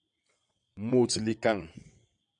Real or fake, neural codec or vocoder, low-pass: fake; vocoder, 22.05 kHz, 80 mel bands, WaveNeXt; 9.9 kHz